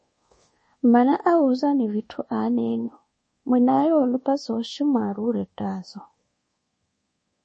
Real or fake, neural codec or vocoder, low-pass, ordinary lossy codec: fake; codec, 24 kHz, 1.2 kbps, DualCodec; 10.8 kHz; MP3, 32 kbps